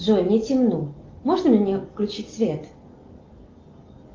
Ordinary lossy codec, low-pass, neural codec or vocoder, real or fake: Opus, 32 kbps; 7.2 kHz; none; real